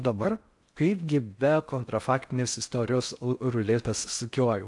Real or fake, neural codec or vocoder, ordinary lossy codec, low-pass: fake; codec, 16 kHz in and 24 kHz out, 0.6 kbps, FocalCodec, streaming, 4096 codes; AAC, 64 kbps; 10.8 kHz